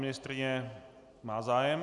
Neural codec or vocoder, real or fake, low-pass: none; real; 10.8 kHz